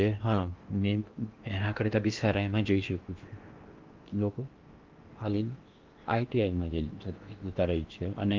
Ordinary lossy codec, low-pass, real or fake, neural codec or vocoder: Opus, 32 kbps; 7.2 kHz; fake; codec, 16 kHz in and 24 kHz out, 0.8 kbps, FocalCodec, streaming, 65536 codes